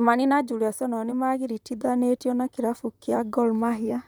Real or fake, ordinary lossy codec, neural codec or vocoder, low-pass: fake; none; vocoder, 44.1 kHz, 128 mel bands, Pupu-Vocoder; none